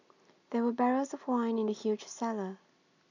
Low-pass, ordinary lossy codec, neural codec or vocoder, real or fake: 7.2 kHz; none; none; real